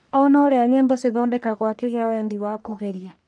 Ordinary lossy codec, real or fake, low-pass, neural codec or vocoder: none; fake; 9.9 kHz; codec, 44.1 kHz, 1.7 kbps, Pupu-Codec